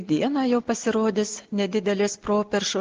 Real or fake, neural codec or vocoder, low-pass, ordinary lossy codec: real; none; 7.2 kHz; Opus, 16 kbps